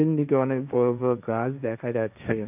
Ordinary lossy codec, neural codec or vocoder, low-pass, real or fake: none; codec, 16 kHz, 1.1 kbps, Voila-Tokenizer; 3.6 kHz; fake